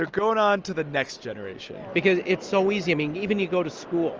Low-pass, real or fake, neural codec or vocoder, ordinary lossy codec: 7.2 kHz; real; none; Opus, 24 kbps